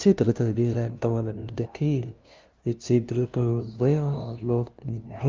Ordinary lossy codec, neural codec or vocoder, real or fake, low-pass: Opus, 24 kbps; codec, 16 kHz, 0.5 kbps, FunCodec, trained on LibriTTS, 25 frames a second; fake; 7.2 kHz